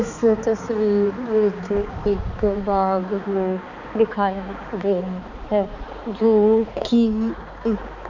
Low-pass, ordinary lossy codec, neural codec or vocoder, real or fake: 7.2 kHz; none; codec, 16 kHz, 4 kbps, X-Codec, HuBERT features, trained on balanced general audio; fake